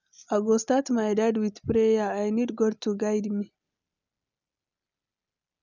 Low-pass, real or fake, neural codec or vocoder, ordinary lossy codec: 7.2 kHz; real; none; none